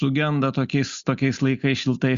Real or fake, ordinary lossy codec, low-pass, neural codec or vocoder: real; Opus, 64 kbps; 7.2 kHz; none